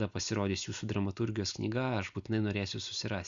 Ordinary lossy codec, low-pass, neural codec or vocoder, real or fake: Opus, 64 kbps; 7.2 kHz; none; real